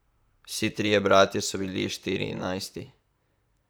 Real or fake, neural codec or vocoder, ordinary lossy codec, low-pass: fake; vocoder, 44.1 kHz, 128 mel bands, Pupu-Vocoder; none; none